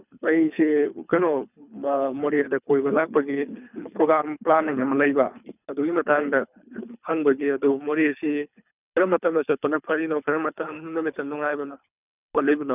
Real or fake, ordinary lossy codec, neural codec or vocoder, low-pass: fake; none; codec, 24 kHz, 3 kbps, HILCodec; 3.6 kHz